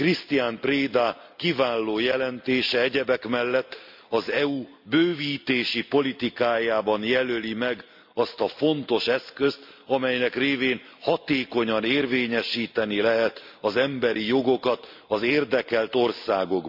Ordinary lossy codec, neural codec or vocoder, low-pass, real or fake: MP3, 48 kbps; none; 5.4 kHz; real